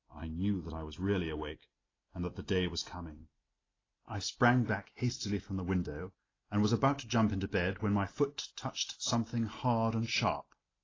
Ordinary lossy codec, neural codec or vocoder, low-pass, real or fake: AAC, 32 kbps; none; 7.2 kHz; real